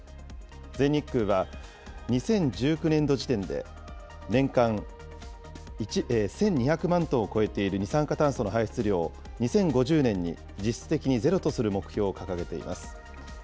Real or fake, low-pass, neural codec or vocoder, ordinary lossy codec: real; none; none; none